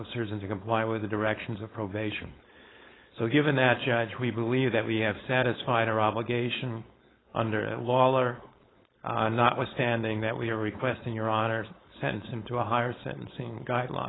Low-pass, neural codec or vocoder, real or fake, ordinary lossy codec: 7.2 kHz; codec, 16 kHz, 4.8 kbps, FACodec; fake; AAC, 16 kbps